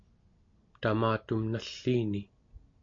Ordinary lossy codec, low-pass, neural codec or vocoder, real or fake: AAC, 48 kbps; 7.2 kHz; none; real